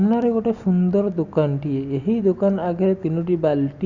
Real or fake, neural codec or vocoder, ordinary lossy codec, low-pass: real; none; Opus, 64 kbps; 7.2 kHz